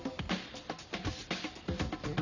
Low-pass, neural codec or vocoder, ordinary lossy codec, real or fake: 7.2 kHz; none; none; real